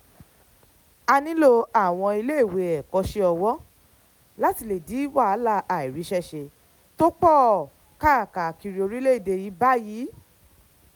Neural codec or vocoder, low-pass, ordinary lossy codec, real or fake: none; none; none; real